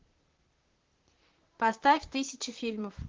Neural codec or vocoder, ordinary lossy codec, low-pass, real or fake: vocoder, 44.1 kHz, 128 mel bands, Pupu-Vocoder; Opus, 16 kbps; 7.2 kHz; fake